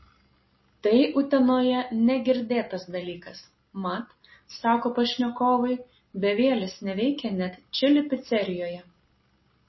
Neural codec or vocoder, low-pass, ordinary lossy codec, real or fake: none; 7.2 kHz; MP3, 24 kbps; real